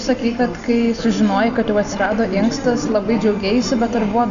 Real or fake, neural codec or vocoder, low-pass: real; none; 7.2 kHz